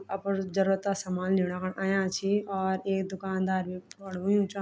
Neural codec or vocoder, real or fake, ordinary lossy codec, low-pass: none; real; none; none